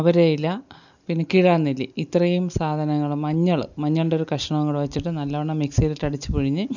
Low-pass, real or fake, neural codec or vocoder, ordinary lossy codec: 7.2 kHz; real; none; none